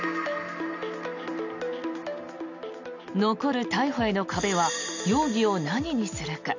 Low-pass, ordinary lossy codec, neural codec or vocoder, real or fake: 7.2 kHz; none; none; real